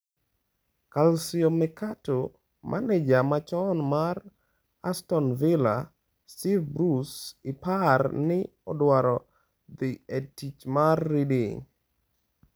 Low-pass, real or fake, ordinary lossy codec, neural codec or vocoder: none; real; none; none